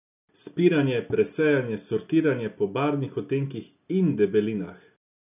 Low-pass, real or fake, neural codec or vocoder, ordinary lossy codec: 3.6 kHz; real; none; none